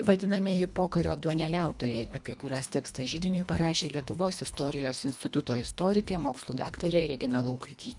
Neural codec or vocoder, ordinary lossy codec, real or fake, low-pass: codec, 24 kHz, 1.5 kbps, HILCodec; MP3, 96 kbps; fake; 10.8 kHz